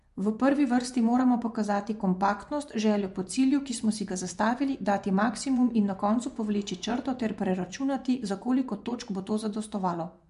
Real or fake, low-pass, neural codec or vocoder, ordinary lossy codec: fake; 10.8 kHz; vocoder, 24 kHz, 100 mel bands, Vocos; MP3, 64 kbps